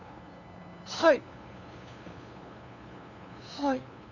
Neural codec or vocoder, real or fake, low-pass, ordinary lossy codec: codec, 16 kHz, 2 kbps, FunCodec, trained on LibriTTS, 25 frames a second; fake; 7.2 kHz; none